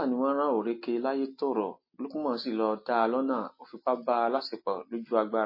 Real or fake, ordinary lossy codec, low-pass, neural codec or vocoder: real; MP3, 24 kbps; 5.4 kHz; none